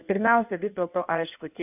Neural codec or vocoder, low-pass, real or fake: codec, 16 kHz in and 24 kHz out, 1.1 kbps, FireRedTTS-2 codec; 3.6 kHz; fake